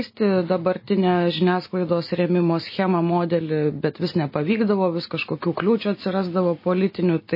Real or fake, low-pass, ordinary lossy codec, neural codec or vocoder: real; 5.4 kHz; MP3, 24 kbps; none